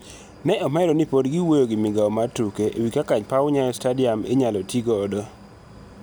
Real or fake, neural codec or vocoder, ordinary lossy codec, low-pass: real; none; none; none